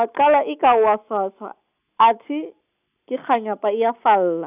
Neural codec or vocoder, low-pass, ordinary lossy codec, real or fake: none; 3.6 kHz; none; real